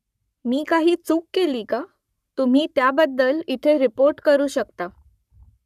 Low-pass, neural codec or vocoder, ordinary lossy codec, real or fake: 14.4 kHz; codec, 44.1 kHz, 7.8 kbps, Pupu-Codec; none; fake